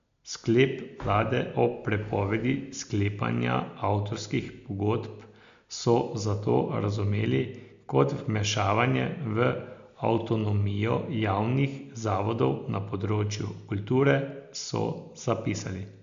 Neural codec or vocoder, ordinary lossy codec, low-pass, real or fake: none; MP3, 64 kbps; 7.2 kHz; real